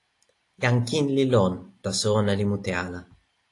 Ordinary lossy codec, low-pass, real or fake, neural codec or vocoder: AAC, 48 kbps; 10.8 kHz; real; none